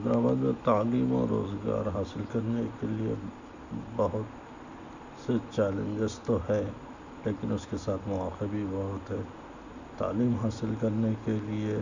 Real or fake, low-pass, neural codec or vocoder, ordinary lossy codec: real; 7.2 kHz; none; none